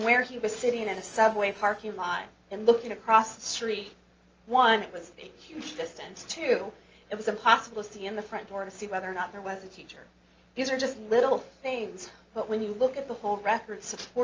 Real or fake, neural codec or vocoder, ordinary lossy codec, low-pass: real; none; Opus, 24 kbps; 7.2 kHz